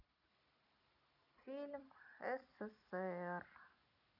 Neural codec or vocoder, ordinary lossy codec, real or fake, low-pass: none; none; real; 5.4 kHz